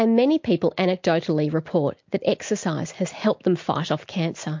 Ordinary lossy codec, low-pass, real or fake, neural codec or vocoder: MP3, 48 kbps; 7.2 kHz; real; none